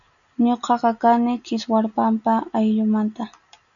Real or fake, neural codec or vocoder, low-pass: real; none; 7.2 kHz